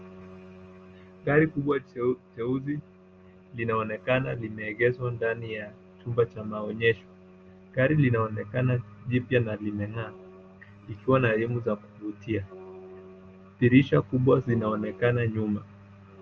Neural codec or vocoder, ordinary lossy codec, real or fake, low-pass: none; Opus, 24 kbps; real; 7.2 kHz